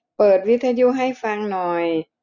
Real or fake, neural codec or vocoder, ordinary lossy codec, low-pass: real; none; none; 7.2 kHz